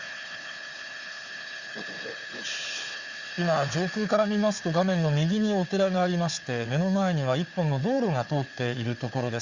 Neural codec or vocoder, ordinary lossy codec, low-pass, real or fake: codec, 16 kHz, 4 kbps, FunCodec, trained on Chinese and English, 50 frames a second; Opus, 64 kbps; 7.2 kHz; fake